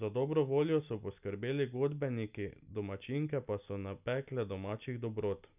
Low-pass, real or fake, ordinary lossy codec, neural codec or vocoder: 3.6 kHz; real; none; none